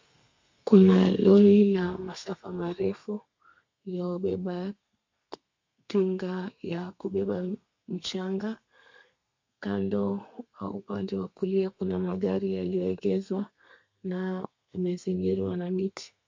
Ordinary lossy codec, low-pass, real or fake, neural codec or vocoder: MP3, 48 kbps; 7.2 kHz; fake; codec, 32 kHz, 1.9 kbps, SNAC